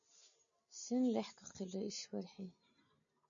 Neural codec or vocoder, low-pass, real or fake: none; 7.2 kHz; real